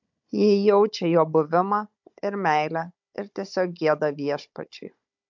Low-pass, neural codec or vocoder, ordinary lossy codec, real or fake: 7.2 kHz; codec, 16 kHz, 16 kbps, FunCodec, trained on Chinese and English, 50 frames a second; MP3, 64 kbps; fake